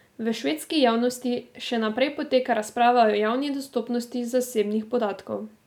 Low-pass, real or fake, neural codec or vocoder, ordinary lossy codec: 19.8 kHz; real; none; none